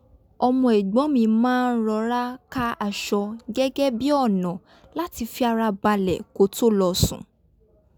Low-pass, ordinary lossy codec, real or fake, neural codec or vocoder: none; none; real; none